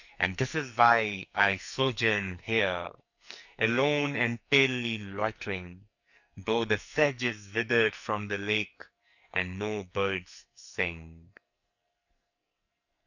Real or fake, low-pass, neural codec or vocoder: fake; 7.2 kHz; codec, 44.1 kHz, 2.6 kbps, SNAC